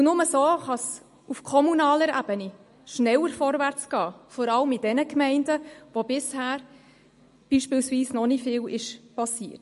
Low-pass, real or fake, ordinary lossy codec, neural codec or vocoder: 14.4 kHz; real; MP3, 48 kbps; none